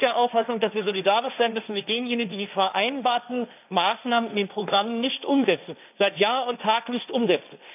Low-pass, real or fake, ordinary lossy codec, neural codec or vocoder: 3.6 kHz; fake; none; codec, 16 kHz, 1.1 kbps, Voila-Tokenizer